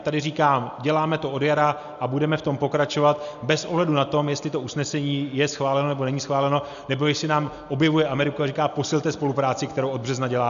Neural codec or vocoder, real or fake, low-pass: none; real; 7.2 kHz